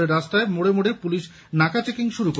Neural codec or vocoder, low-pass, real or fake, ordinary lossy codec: none; none; real; none